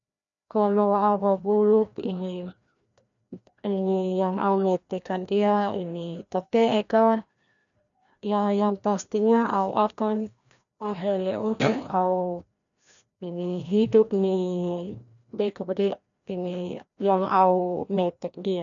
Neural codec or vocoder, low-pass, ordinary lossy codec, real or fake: codec, 16 kHz, 1 kbps, FreqCodec, larger model; 7.2 kHz; none; fake